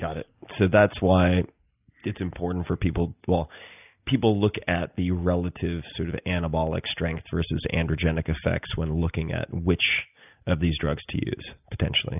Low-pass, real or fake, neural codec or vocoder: 3.6 kHz; real; none